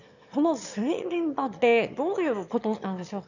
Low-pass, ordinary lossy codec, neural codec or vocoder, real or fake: 7.2 kHz; none; autoencoder, 22.05 kHz, a latent of 192 numbers a frame, VITS, trained on one speaker; fake